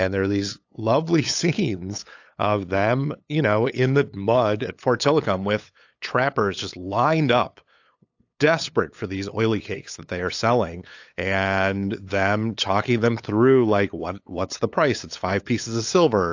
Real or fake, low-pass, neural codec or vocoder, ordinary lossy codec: fake; 7.2 kHz; codec, 16 kHz, 8 kbps, FunCodec, trained on LibriTTS, 25 frames a second; AAC, 48 kbps